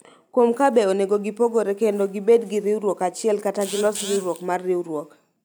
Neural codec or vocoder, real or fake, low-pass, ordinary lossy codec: none; real; none; none